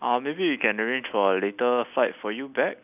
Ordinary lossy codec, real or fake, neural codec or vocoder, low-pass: none; real; none; 3.6 kHz